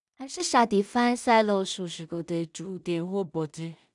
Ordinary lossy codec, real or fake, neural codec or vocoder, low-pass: none; fake; codec, 16 kHz in and 24 kHz out, 0.4 kbps, LongCat-Audio-Codec, two codebook decoder; 10.8 kHz